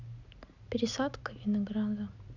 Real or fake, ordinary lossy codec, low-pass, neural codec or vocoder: real; none; 7.2 kHz; none